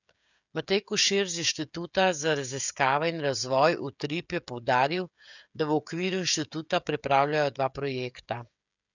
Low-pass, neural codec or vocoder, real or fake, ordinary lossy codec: 7.2 kHz; codec, 16 kHz, 16 kbps, FreqCodec, smaller model; fake; none